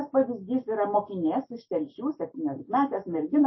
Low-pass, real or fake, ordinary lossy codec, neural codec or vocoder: 7.2 kHz; real; MP3, 24 kbps; none